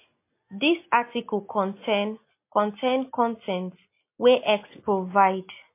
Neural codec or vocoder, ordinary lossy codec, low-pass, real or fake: none; MP3, 24 kbps; 3.6 kHz; real